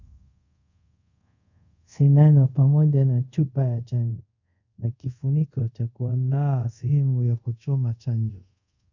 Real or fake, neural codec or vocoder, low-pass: fake; codec, 24 kHz, 0.5 kbps, DualCodec; 7.2 kHz